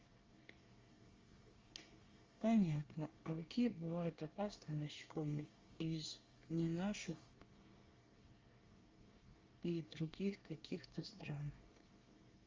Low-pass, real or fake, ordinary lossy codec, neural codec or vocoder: 7.2 kHz; fake; Opus, 32 kbps; codec, 24 kHz, 1 kbps, SNAC